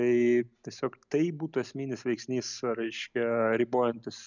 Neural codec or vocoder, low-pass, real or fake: none; 7.2 kHz; real